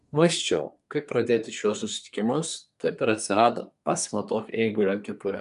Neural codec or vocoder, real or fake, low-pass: codec, 24 kHz, 1 kbps, SNAC; fake; 10.8 kHz